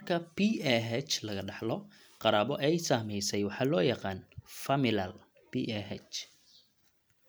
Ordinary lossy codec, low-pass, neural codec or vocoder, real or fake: none; none; none; real